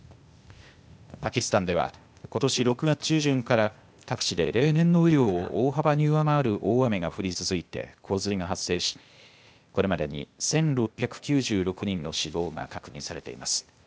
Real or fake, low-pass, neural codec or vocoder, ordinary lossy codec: fake; none; codec, 16 kHz, 0.8 kbps, ZipCodec; none